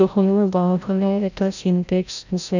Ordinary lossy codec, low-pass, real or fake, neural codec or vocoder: none; 7.2 kHz; fake; codec, 16 kHz, 0.5 kbps, FreqCodec, larger model